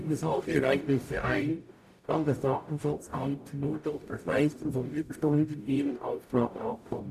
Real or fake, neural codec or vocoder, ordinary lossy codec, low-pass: fake; codec, 44.1 kHz, 0.9 kbps, DAC; none; 14.4 kHz